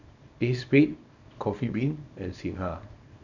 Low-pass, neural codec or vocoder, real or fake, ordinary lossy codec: 7.2 kHz; codec, 24 kHz, 0.9 kbps, WavTokenizer, small release; fake; none